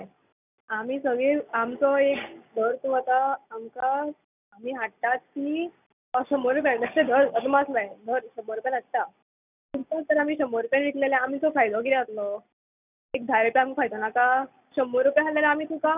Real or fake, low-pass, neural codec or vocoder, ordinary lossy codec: real; 3.6 kHz; none; none